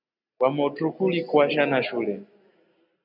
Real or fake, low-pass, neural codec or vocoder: real; 5.4 kHz; none